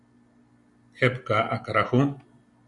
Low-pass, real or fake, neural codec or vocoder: 10.8 kHz; real; none